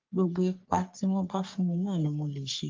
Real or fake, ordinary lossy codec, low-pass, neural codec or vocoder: fake; Opus, 24 kbps; 7.2 kHz; codec, 44.1 kHz, 3.4 kbps, Pupu-Codec